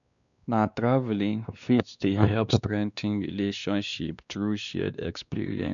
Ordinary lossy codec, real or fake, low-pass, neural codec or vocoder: none; fake; 7.2 kHz; codec, 16 kHz, 2 kbps, X-Codec, WavLM features, trained on Multilingual LibriSpeech